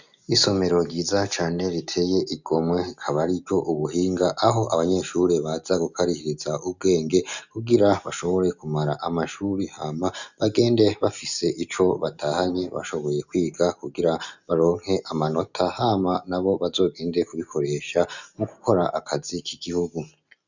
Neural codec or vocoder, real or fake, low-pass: none; real; 7.2 kHz